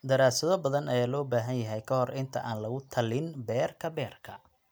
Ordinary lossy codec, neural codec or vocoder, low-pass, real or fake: none; none; none; real